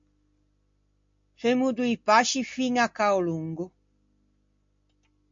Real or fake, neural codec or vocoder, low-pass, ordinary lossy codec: real; none; 7.2 kHz; MP3, 48 kbps